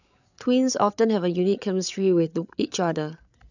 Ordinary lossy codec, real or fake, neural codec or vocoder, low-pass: none; fake; codec, 16 kHz, 8 kbps, FreqCodec, larger model; 7.2 kHz